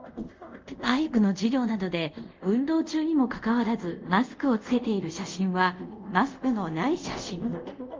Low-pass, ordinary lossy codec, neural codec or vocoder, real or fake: 7.2 kHz; Opus, 32 kbps; codec, 24 kHz, 0.5 kbps, DualCodec; fake